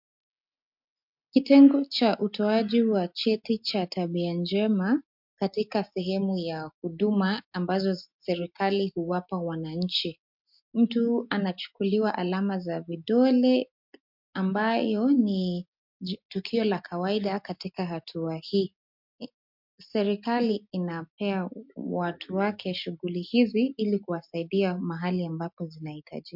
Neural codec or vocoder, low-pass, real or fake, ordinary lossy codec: none; 5.4 kHz; real; MP3, 48 kbps